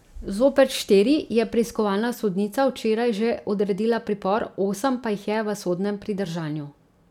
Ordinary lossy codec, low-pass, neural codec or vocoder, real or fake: none; 19.8 kHz; none; real